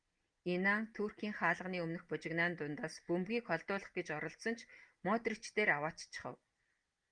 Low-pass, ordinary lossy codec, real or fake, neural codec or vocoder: 9.9 kHz; Opus, 32 kbps; real; none